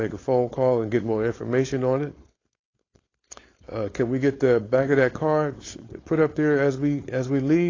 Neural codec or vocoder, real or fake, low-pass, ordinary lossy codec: codec, 16 kHz, 4.8 kbps, FACodec; fake; 7.2 kHz; AAC, 32 kbps